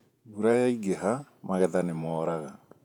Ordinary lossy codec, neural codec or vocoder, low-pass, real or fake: none; vocoder, 48 kHz, 128 mel bands, Vocos; 19.8 kHz; fake